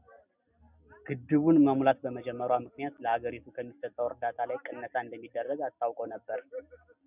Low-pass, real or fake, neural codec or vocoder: 3.6 kHz; real; none